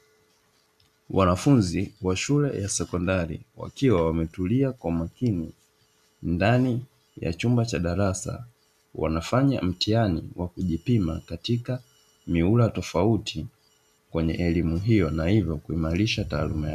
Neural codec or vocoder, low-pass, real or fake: none; 14.4 kHz; real